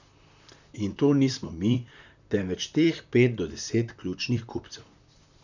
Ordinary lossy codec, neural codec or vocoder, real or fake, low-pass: none; vocoder, 44.1 kHz, 128 mel bands, Pupu-Vocoder; fake; 7.2 kHz